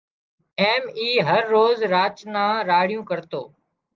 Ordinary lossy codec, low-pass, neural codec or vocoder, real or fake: Opus, 32 kbps; 7.2 kHz; none; real